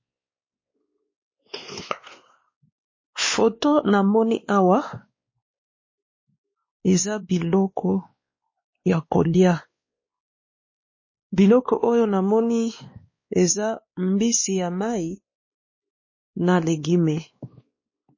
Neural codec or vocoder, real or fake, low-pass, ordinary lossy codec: codec, 16 kHz, 2 kbps, X-Codec, WavLM features, trained on Multilingual LibriSpeech; fake; 7.2 kHz; MP3, 32 kbps